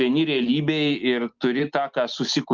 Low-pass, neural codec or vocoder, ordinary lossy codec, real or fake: 7.2 kHz; none; Opus, 24 kbps; real